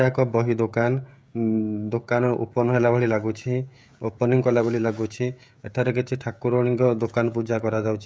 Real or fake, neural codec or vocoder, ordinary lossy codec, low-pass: fake; codec, 16 kHz, 16 kbps, FreqCodec, smaller model; none; none